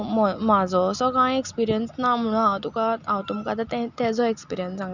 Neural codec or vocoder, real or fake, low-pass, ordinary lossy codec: none; real; 7.2 kHz; none